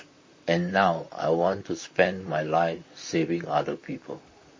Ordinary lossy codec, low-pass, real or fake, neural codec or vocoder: MP3, 32 kbps; 7.2 kHz; fake; vocoder, 44.1 kHz, 128 mel bands, Pupu-Vocoder